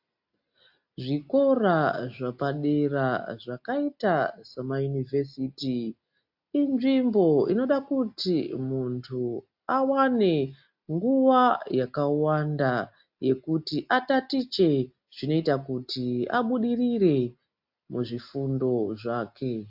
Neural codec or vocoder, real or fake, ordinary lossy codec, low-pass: none; real; AAC, 48 kbps; 5.4 kHz